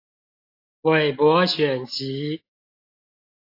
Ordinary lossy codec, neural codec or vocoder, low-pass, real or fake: AAC, 32 kbps; none; 5.4 kHz; real